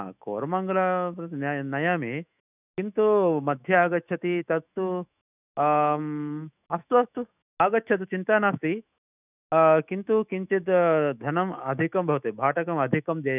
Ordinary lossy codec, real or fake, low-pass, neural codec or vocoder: none; fake; 3.6 kHz; autoencoder, 48 kHz, 128 numbers a frame, DAC-VAE, trained on Japanese speech